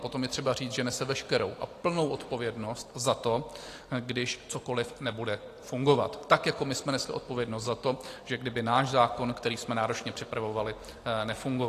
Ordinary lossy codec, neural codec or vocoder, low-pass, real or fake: AAC, 48 kbps; none; 14.4 kHz; real